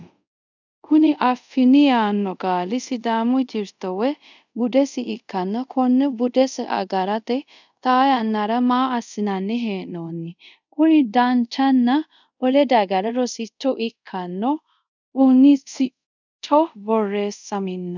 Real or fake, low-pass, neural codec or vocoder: fake; 7.2 kHz; codec, 24 kHz, 0.5 kbps, DualCodec